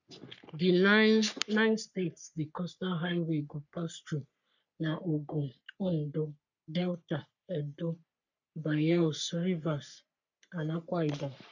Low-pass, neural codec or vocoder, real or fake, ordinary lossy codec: 7.2 kHz; codec, 44.1 kHz, 3.4 kbps, Pupu-Codec; fake; none